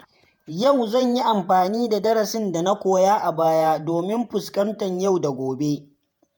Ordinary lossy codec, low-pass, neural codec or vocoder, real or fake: none; none; vocoder, 48 kHz, 128 mel bands, Vocos; fake